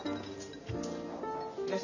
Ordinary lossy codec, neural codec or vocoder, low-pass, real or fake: none; none; 7.2 kHz; real